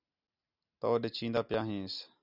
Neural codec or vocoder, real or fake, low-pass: none; real; 5.4 kHz